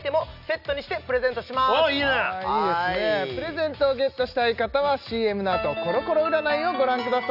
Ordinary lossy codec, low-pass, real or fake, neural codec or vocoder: none; 5.4 kHz; real; none